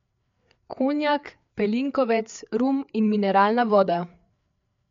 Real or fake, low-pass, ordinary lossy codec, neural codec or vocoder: fake; 7.2 kHz; MP3, 64 kbps; codec, 16 kHz, 4 kbps, FreqCodec, larger model